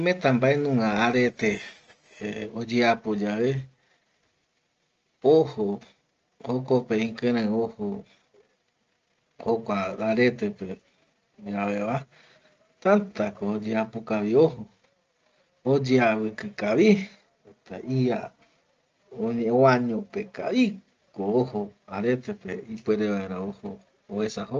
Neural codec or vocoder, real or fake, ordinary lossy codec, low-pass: none; real; Opus, 24 kbps; 7.2 kHz